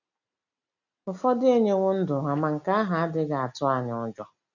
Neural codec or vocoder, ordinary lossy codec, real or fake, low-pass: none; none; real; 7.2 kHz